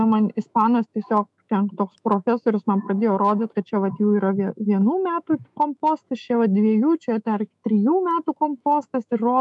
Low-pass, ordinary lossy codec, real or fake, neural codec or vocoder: 10.8 kHz; MP3, 96 kbps; fake; autoencoder, 48 kHz, 128 numbers a frame, DAC-VAE, trained on Japanese speech